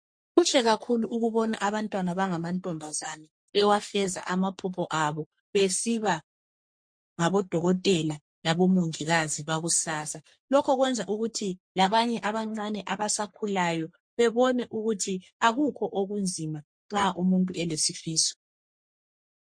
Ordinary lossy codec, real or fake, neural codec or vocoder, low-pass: MP3, 48 kbps; fake; codec, 44.1 kHz, 3.4 kbps, Pupu-Codec; 9.9 kHz